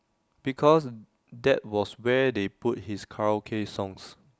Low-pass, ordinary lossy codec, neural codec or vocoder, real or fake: none; none; none; real